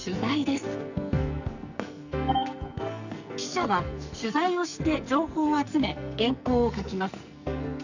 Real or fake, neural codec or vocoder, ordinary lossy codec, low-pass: fake; codec, 44.1 kHz, 2.6 kbps, SNAC; none; 7.2 kHz